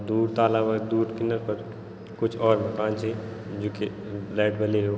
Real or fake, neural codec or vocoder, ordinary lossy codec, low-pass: real; none; none; none